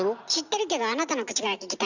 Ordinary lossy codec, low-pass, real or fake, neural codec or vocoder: none; 7.2 kHz; real; none